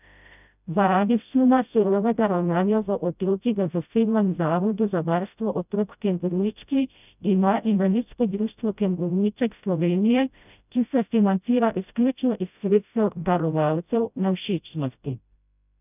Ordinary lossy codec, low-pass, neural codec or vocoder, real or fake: none; 3.6 kHz; codec, 16 kHz, 0.5 kbps, FreqCodec, smaller model; fake